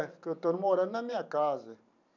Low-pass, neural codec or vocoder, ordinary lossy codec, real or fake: 7.2 kHz; vocoder, 44.1 kHz, 128 mel bands every 512 samples, BigVGAN v2; none; fake